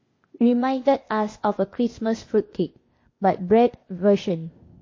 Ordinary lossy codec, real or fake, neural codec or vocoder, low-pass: MP3, 32 kbps; fake; codec, 16 kHz, 0.8 kbps, ZipCodec; 7.2 kHz